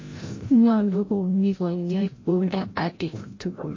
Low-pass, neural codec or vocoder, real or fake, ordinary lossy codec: 7.2 kHz; codec, 16 kHz, 0.5 kbps, FreqCodec, larger model; fake; MP3, 32 kbps